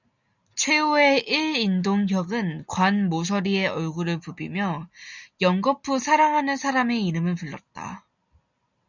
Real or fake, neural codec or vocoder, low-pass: real; none; 7.2 kHz